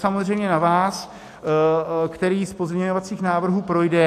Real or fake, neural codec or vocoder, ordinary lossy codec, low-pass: real; none; AAC, 64 kbps; 14.4 kHz